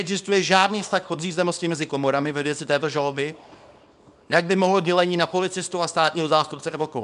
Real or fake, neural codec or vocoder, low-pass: fake; codec, 24 kHz, 0.9 kbps, WavTokenizer, small release; 10.8 kHz